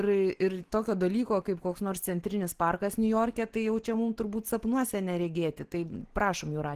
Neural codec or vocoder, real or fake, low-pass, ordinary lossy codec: none; real; 14.4 kHz; Opus, 16 kbps